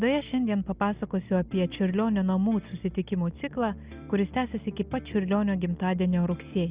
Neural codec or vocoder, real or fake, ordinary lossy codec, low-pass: none; real; Opus, 64 kbps; 3.6 kHz